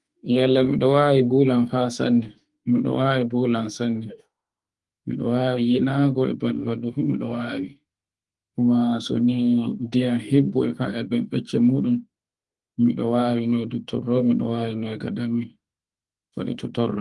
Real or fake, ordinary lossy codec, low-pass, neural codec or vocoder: fake; Opus, 24 kbps; 10.8 kHz; autoencoder, 48 kHz, 32 numbers a frame, DAC-VAE, trained on Japanese speech